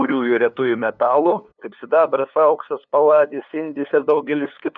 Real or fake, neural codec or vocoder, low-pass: fake; codec, 16 kHz, 2 kbps, FunCodec, trained on LibriTTS, 25 frames a second; 7.2 kHz